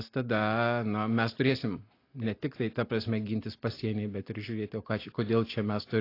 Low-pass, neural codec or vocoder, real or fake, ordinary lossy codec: 5.4 kHz; none; real; AAC, 32 kbps